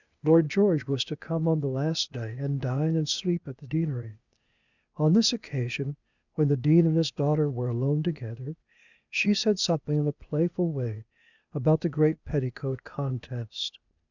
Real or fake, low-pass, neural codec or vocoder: fake; 7.2 kHz; codec, 16 kHz, 0.8 kbps, ZipCodec